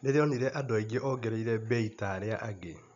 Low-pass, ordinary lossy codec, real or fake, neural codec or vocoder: 7.2 kHz; none; real; none